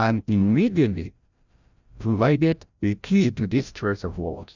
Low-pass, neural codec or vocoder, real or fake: 7.2 kHz; codec, 16 kHz, 0.5 kbps, FreqCodec, larger model; fake